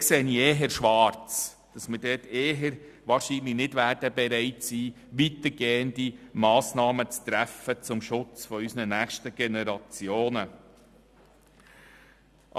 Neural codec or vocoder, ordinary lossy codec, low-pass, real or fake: none; Opus, 64 kbps; 14.4 kHz; real